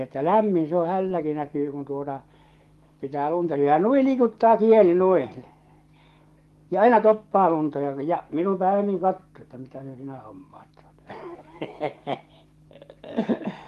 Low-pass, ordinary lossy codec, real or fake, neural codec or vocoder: 14.4 kHz; Opus, 24 kbps; fake; codec, 44.1 kHz, 7.8 kbps, DAC